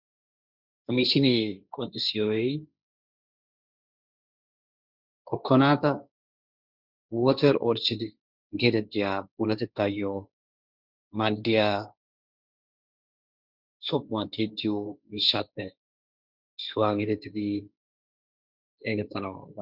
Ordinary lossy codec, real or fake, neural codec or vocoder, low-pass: Opus, 64 kbps; fake; codec, 16 kHz, 1.1 kbps, Voila-Tokenizer; 5.4 kHz